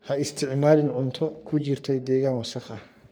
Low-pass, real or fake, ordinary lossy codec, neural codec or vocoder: none; fake; none; codec, 44.1 kHz, 3.4 kbps, Pupu-Codec